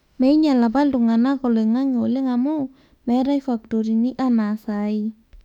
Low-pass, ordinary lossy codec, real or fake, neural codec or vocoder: 19.8 kHz; none; fake; autoencoder, 48 kHz, 32 numbers a frame, DAC-VAE, trained on Japanese speech